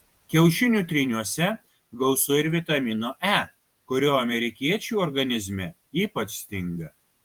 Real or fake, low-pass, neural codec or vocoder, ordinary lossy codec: fake; 19.8 kHz; vocoder, 48 kHz, 128 mel bands, Vocos; Opus, 32 kbps